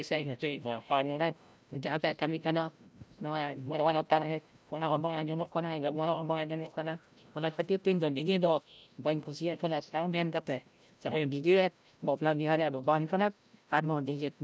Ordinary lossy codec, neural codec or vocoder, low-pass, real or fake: none; codec, 16 kHz, 0.5 kbps, FreqCodec, larger model; none; fake